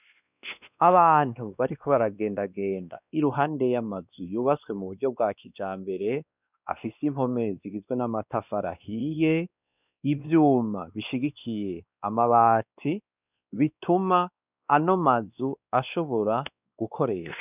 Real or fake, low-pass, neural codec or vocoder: fake; 3.6 kHz; codec, 16 kHz, 2 kbps, X-Codec, WavLM features, trained on Multilingual LibriSpeech